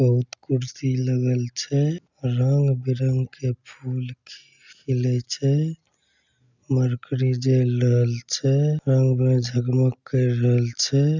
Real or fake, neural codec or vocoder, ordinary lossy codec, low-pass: real; none; none; 7.2 kHz